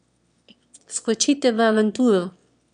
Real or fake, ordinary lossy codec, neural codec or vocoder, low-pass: fake; none; autoencoder, 22.05 kHz, a latent of 192 numbers a frame, VITS, trained on one speaker; 9.9 kHz